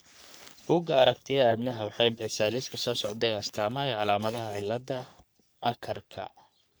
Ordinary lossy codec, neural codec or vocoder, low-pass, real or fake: none; codec, 44.1 kHz, 3.4 kbps, Pupu-Codec; none; fake